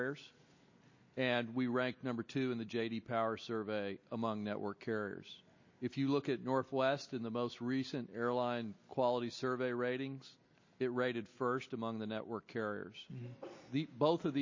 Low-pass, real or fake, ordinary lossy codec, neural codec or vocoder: 7.2 kHz; real; MP3, 32 kbps; none